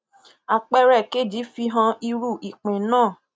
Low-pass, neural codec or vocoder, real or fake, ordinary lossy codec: none; none; real; none